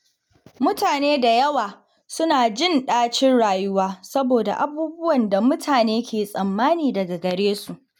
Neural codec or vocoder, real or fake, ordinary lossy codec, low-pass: none; real; none; 19.8 kHz